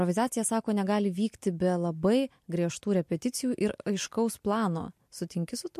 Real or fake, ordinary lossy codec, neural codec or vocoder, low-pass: real; MP3, 64 kbps; none; 14.4 kHz